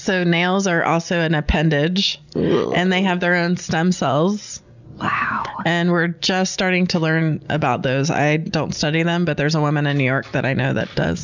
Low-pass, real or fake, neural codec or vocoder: 7.2 kHz; real; none